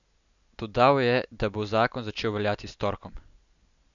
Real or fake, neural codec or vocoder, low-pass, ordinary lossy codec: real; none; 7.2 kHz; none